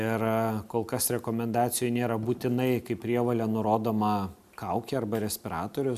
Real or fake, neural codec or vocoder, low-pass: real; none; 14.4 kHz